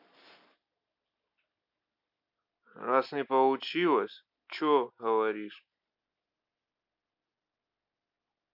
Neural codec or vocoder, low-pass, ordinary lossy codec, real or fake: none; 5.4 kHz; none; real